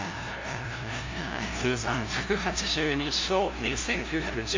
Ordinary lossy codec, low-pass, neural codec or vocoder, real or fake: MP3, 64 kbps; 7.2 kHz; codec, 16 kHz, 0.5 kbps, FunCodec, trained on LibriTTS, 25 frames a second; fake